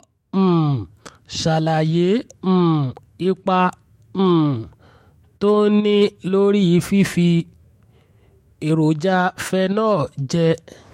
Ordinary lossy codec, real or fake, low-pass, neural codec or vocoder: MP3, 64 kbps; fake; 19.8 kHz; autoencoder, 48 kHz, 128 numbers a frame, DAC-VAE, trained on Japanese speech